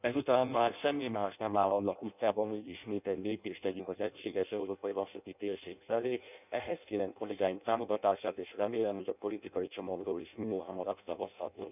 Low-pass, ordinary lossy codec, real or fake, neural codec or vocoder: 3.6 kHz; none; fake; codec, 16 kHz in and 24 kHz out, 0.6 kbps, FireRedTTS-2 codec